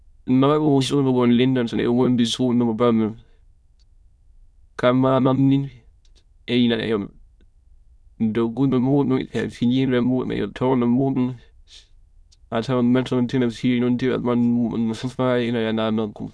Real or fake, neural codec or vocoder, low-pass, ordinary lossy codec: fake; autoencoder, 22.05 kHz, a latent of 192 numbers a frame, VITS, trained on many speakers; none; none